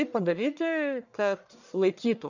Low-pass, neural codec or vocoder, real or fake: 7.2 kHz; codec, 44.1 kHz, 1.7 kbps, Pupu-Codec; fake